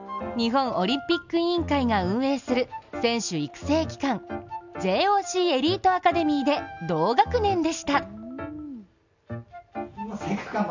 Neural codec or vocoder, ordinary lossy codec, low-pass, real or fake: none; none; 7.2 kHz; real